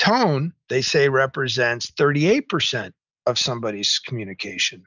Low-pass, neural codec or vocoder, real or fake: 7.2 kHz; none; real